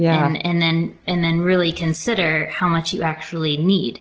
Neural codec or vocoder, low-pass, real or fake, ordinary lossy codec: none; 7.2 kHz; real; Opus, 16 kbps